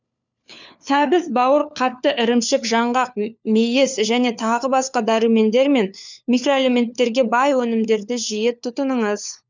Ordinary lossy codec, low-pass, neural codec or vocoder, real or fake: none; 7.2 kHz; codec, 16 kHz, 4 kbps, FunCodec, trained on LibriTTS, 50 frames a second; fake